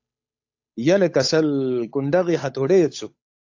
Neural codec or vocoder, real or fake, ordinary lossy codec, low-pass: codec, 16 kHz, 8 kbps, FunCodec, trained on Chinese and English, 25 frames a second; fake; AAC, 48 kbps; 7.2 kHz